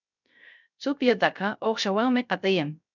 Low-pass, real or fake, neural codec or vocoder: 7.2 kHz; fake; codec, 16 kHz, 0.3 kbps, FocalCodec